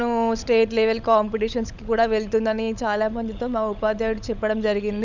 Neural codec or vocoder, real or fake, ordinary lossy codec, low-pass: codec, 16 kHz, 16 kbps, FunCodec, trained on LibriTTS, 50 frames a second; fake; none; 7.2 kHz